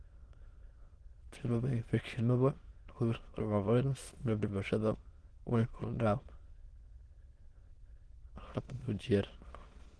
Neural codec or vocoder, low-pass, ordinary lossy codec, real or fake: autoencoder, 22.05 kHz, a latent of 192 numbers a frame, VITS, trained on many speakers; 9.9 kHz; Opus, 16 kbps; fake